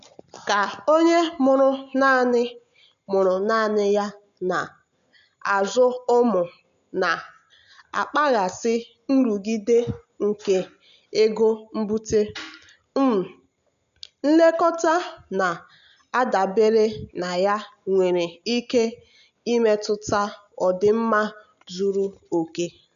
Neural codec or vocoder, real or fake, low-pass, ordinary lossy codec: none; real; 7.2 kHz; none